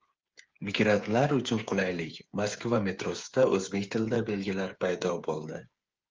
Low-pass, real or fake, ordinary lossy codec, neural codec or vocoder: 7.2 kHz; fake; Opus, 32 kbps; codec, 16 kHz, 8 kbps, FreqCodec, smaller model